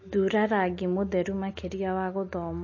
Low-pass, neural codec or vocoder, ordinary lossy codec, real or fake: 7.2 kHz; none; MP3, 32 kbps; real